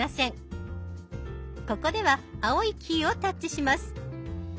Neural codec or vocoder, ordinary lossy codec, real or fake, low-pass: none; none; real; none